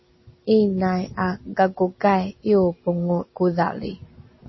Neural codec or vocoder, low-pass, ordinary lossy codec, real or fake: none; 7.2 kHz; MP3, 24 kbps; real